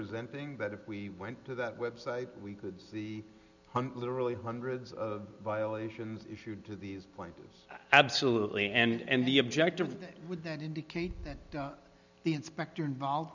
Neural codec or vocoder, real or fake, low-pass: none; real; 7.2 kHz